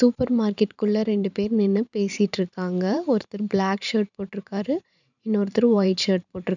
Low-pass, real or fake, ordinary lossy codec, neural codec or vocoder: 7.2 kHz; fake; none; vocoder, 44.1 kHz, 128 mel bands every 512 samples, BigVGAN v2